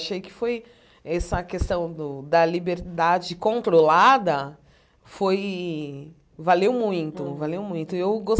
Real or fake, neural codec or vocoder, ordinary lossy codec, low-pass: real; none; none; none